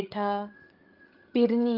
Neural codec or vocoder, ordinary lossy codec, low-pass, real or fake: codec, 16 kHz, 16 kbps, FreqCodec, larger model; Opus, 24 kbps; 5.4 kHz; fake